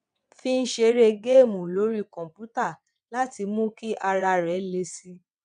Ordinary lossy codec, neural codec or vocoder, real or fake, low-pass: none; vocoder, 22.05 kHz, 80 mel bands, WaveNeXt; fake; 9.9 kHz